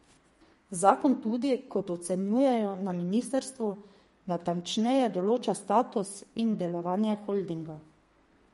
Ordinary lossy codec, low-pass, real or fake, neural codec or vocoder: MP3, 48 kbps; 14.4 kHz; fake; codec, 32 kHz, 1.9 kbps, SNAC